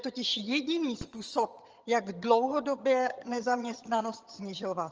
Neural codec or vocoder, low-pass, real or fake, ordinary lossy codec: vocoder, 22.05 kHz, 80 mel bands, HiFi-GAN; 7.2 kHz; fake; Opus, 24 kbps